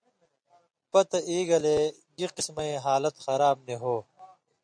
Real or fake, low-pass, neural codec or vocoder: real; 9.9 kHz; none